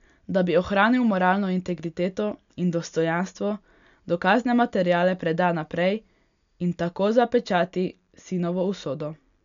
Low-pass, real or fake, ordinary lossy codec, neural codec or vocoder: 7.2 kHz; real; none; none